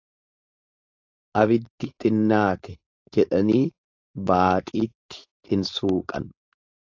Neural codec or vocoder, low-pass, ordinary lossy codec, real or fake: codec, 16 kHz, 4.8 kbps, FACodec; 7.2 kHz; AAC, 48 kbps; fake